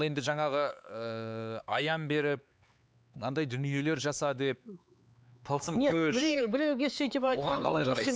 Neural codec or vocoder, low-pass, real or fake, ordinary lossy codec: codec, 16 kHz, 4 kbps, X-Codec, HuBERT features, trained on LibriSpeech; none; fake; none